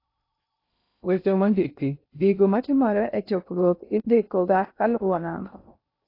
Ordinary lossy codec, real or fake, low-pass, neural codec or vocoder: AAC, 32 kbps; fake; 5.4 kHz; codec, 16 kHz in and 24 kHz out, 0.6 kbps, FocalCodec, streaming, 2048 codes